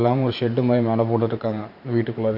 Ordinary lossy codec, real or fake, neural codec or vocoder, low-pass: none; fake; vocoder, 44.1 kHz, 128 mel bands every 512 samples, BigVGAN v2; 5.4 kHz